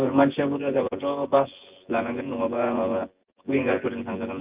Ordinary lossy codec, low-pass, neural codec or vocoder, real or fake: Opus, 24 kbps; 3.6 kHz; vocoder, 24 kHz, 100 mel bands, Vocos; fake